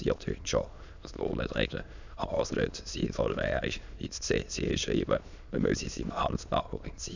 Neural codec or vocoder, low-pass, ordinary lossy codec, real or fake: autoencoder, 22.05 kHz, a latent of 192 numbers a frame, VITS, trained on many speakers; 7.2 kHz; none; fake